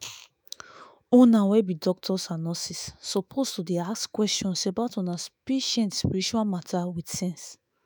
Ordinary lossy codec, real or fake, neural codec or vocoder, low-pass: none; fake; autoencoder, 48 kHz, 128 numbers a frame, DAC-VAE, trained on Japanese speech; none